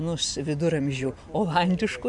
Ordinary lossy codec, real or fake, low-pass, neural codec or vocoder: MP3, 64 kbps; real; 10.8 kHz; none